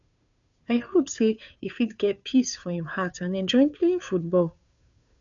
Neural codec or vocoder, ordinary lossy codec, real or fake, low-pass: codec, 16 kHz, 2 kbps, FunCodec, trained on Chinese and English, 25 frames a second; none; fake; 7.2 kHz